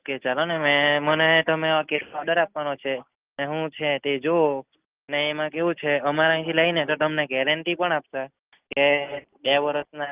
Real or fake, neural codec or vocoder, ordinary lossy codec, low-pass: real; none; Opus, 24 kbps; 3.6 kHz